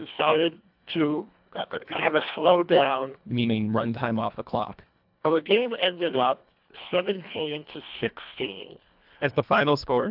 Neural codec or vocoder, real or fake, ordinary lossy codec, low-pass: codec, 24 kHz, 1.5 kbps, HILCodec; fake; AAC, 48 kbps; 5.4 kHz